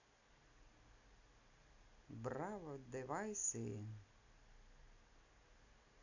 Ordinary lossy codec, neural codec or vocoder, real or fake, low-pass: none; none; real; 7.2 kHz